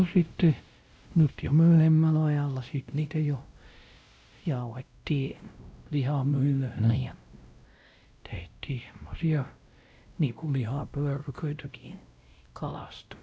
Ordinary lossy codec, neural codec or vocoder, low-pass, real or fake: none; codec, 16 kHz, 0.5 kbps, X-Codec, WavLM features, trained on Multilingual LibriSpeech; none; fake